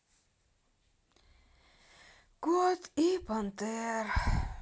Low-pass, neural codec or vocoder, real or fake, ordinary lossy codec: none; none; real; none